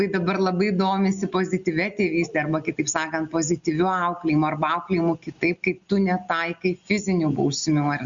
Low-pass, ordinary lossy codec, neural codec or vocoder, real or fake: 7.2 kHz; Opus, 64 kbps; none; real